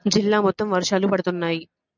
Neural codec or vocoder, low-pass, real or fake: none; 7.2 kHz; real